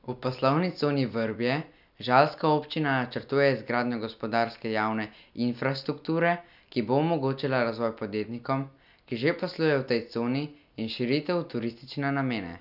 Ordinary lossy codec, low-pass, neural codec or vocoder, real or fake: none; 5.4 kHz; none; real